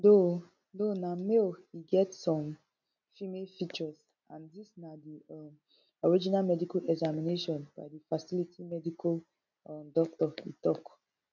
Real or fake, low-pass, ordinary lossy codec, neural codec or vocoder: real; 7.2 kHz; none; none